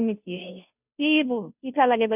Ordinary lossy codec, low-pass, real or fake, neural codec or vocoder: none; 3.6 kHz; fake; codec, 16 kHz, 0.5 kbps, FunCodec, trained on Chinese and English, 25 frames a second